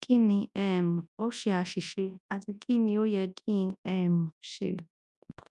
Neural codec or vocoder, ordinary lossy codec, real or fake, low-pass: codec, 24 kHz, 0.9 kbps, WavTokenizer, large speech release; none; fake; 10.8 kHz